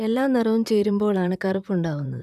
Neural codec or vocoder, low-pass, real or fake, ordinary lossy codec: vocoder, 44.1 kHz, 128 mel bands, Pupu-Vocoder; 14.4 kHz; fake; none